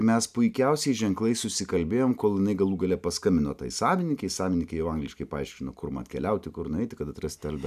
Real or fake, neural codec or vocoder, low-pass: real; none; 14.4 kHz